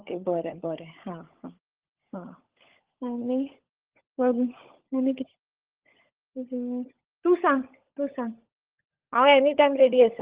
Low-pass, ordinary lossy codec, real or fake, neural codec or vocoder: 3.6 kHz; Opus, 16 kbps; fake; codec, 16 kHz, 16 kbps, FunCodec, trained on LibriTTS, 50 frames a second